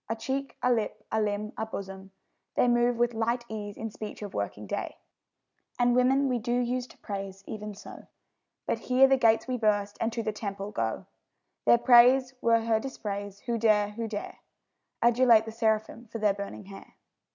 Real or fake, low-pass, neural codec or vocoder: real; 7.2 kHz; none